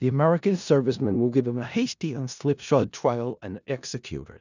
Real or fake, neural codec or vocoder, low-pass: fake; codec, 16 kHz in and 24 kHz out, 0.4 kbps, LongCat-Audio-Codec, four codebook decoder; 7.2 kHz